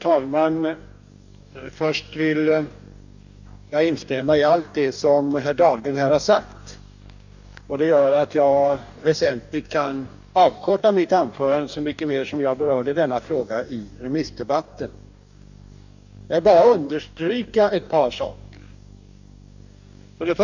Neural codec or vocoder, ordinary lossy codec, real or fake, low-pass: codec, 44.1 kHz, 2.6 kbps, DAC; none; fake; 7.2 kHz